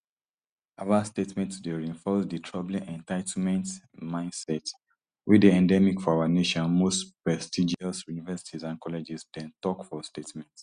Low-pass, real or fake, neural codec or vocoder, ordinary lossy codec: 9.9 kHz; real; none; Opus, 64 kbps